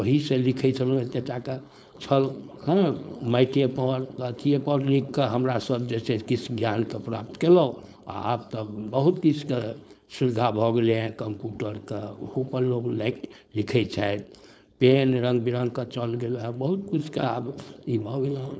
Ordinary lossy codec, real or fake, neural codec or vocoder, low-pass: none; fake; codec, 16 kHz, 4.8 kbps, FACodec; none